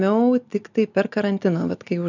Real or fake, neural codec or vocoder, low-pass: real; none; 7.2 kHz